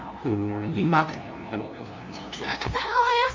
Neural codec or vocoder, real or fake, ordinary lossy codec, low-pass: codec, 16 kHz, 0.5 kbps, FunCodec, trained on LibriTTS, 25 frames a second; fake; MP3, 48 kbps; 7.2 kHz